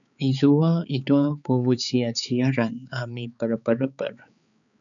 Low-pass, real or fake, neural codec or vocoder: 7.2 kHz; fake; codec, 16 kHz, 4 kbps, X-Codec, HuBERT features, trained on balanced general audio